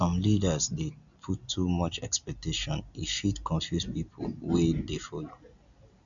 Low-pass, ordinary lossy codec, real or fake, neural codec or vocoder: 7.2 kHz; none; real; none